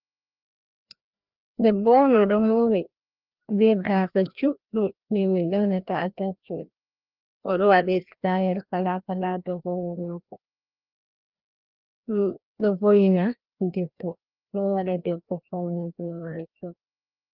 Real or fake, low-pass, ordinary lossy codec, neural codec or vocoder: fake; 5.4 kHz; Opus, 32 kbps; codec, 16 kHz, 1 kbps, FreqCodec, larger model